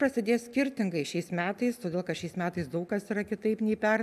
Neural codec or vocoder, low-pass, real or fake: none; 14.4 kHz; real